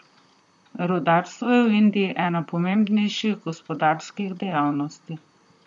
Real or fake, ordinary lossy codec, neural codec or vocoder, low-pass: fake; none; vocoder, 44.1 kHz, 128 mel bands, Pupu-Vocoder; 10.8 kHz